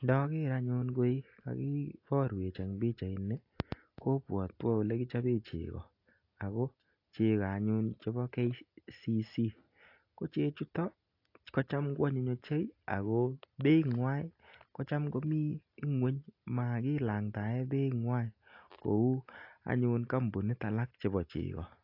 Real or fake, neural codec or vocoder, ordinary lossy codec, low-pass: real; none; AAC, 32 kbps; 5.4 kHz